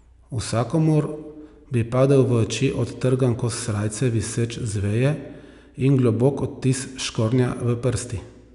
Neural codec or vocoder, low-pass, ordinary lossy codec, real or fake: none; 10.8 kHz; none; real